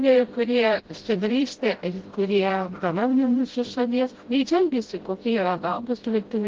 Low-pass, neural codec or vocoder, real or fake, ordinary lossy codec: 7.2 kHz; codec, 16 kHz, 0.5 kbps, FreqCodec, smaller model; fake; Opus, 16 kbps